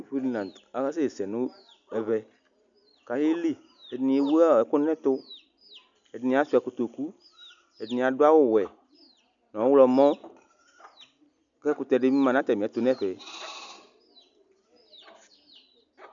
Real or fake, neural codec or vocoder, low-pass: real; none; 7.2 kHz